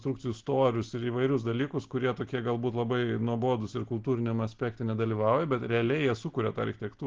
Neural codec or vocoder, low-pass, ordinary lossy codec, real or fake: none; 7.2 kHz; Opus, 16 kbps; real